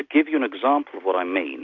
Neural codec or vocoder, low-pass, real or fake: none; 7.2 kHz; real